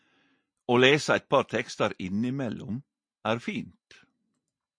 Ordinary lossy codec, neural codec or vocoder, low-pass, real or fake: MP3, 48 kbps; none; 9.9 kHz; real